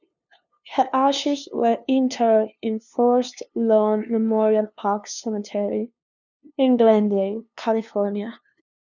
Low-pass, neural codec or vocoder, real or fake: 7.2 kHz; codec, 16 kHz, 2 kbps, FunCodec, trained on LibriTTS, 25 frames a second; fake